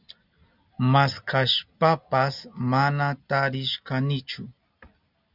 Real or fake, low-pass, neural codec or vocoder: real; 5.4 kHz; none